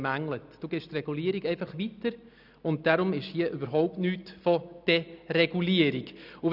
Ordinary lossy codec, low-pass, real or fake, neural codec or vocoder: none; 5.4 kHz; real; none